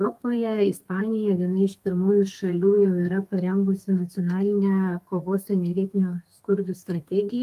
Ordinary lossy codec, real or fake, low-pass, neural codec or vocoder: Opus, 32 kbps; fake; 14.4 kHz; codec, 32 kHz, 1.9 kbps, SNAC